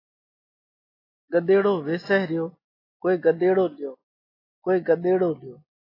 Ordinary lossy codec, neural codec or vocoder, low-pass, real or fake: AAC, 24 kbps; none; 5.4 kHz; real